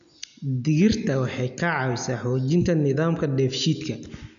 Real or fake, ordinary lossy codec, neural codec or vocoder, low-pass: real; none; none; 7.2 kHz